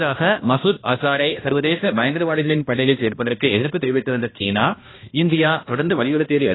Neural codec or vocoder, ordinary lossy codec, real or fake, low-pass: codec, 16 kHz, 1 kbps, X-Codec, HuBERT features, trained on balanced general audio; AAC, 16 kbps; fake; 7.2 kHz